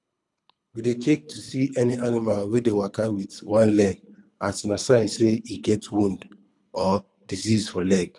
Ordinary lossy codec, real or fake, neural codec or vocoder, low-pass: none; fake; codec, 24 kHz, 3 kbps, HILCodec; 10.8 kHz